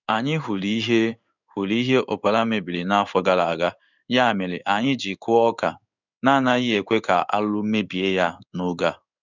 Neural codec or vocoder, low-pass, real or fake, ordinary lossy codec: codec, 16 kHz in and 24 kHz out, 1 kbps, XY-Tokenizer; 7.2 kHz; fake; none